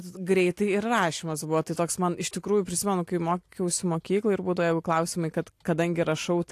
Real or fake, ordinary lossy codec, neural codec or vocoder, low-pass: real; AAC, 64 kbps; none; 14.4 kHz